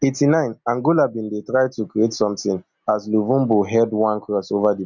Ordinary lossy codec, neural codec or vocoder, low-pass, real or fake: none; none; 7.2 kHz; real